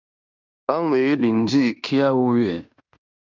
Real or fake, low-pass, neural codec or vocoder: fake; 7.2 kHz; codec, 16 kHz in and 24 kHz out, 0.9 kbps, LongCat-Audio-Codec, fine tuned four codebook decoder